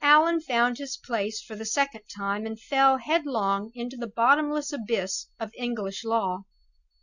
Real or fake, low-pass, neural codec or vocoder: real; 7.2 kHz; none